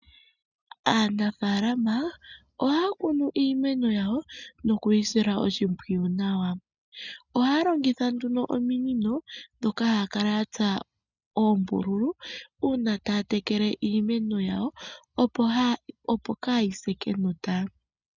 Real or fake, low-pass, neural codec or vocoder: real; 7.2 kHz; none